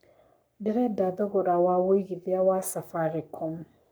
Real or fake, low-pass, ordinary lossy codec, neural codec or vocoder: fake; none; none; codec, 44.1 kHz, 7.8 kbps, Pupu-Codec